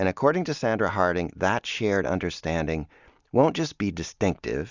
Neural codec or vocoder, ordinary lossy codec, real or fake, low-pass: none; Opus, 64 kbps; real; 7.2 kHz